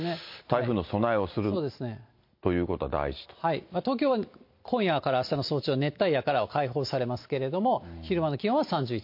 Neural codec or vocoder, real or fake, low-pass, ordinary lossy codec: none; real; 5.4 kHz; MP3, 32 kbps